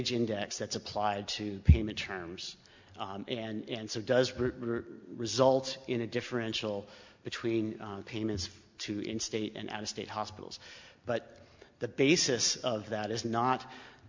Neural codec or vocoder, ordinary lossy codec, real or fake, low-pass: none; MP3, 64 kbps; real; 7.2 kHz